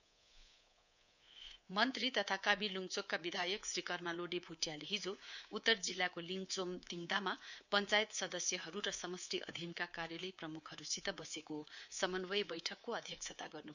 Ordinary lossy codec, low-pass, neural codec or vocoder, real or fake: none; 7.2 kHz; codec, 24 kHz, 3.1 kbps, DualCodec; fake